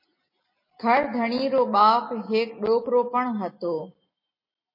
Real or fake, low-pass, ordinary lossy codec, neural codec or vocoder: real; 5.4 kHz; MP3, 24 kbps; none